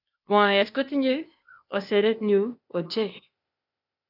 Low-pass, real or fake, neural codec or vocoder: 5.4 kHz; fake; codec, 16 kHz, 0.8 kbps, ZipCodec